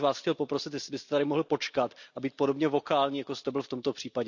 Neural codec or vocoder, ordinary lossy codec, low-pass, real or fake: none; none; 7.2 kHz; real